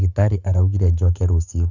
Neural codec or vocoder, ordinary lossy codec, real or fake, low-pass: codec, 44.1 kHz, 7.8 kbps, Pupu-Codec; none; fake; 7.2 kHz